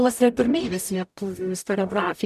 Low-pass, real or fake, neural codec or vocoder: 14.4 kHz; fake; codec, 44.1 kHz, 0.9 kbps, DAC